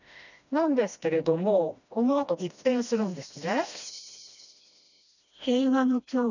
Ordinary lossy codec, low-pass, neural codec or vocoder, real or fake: none; 7.2 kHz; codec, 16 kHz, 1 kbps, FreqCodec, smaller model; fake